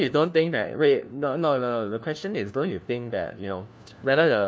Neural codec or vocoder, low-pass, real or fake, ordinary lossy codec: codec, 16 kHz, 1 kbps, FunCodec, trained on LibriTTS, 50 frames a second; none; fake; none